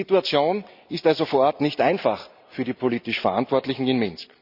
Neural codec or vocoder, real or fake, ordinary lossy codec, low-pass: none; real; none; 5.4 kHz